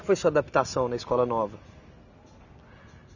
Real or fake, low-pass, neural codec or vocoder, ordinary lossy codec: real; 7.2 kHz; none; none